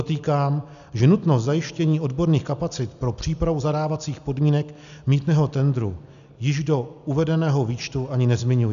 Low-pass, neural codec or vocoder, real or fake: 7.2 kHz; none; real